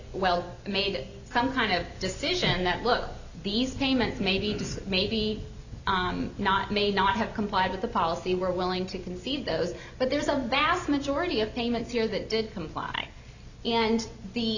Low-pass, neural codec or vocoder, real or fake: 7.2 kHz; none; real